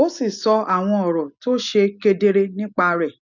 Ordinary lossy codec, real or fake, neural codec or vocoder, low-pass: none; real; none; 7.2 kHz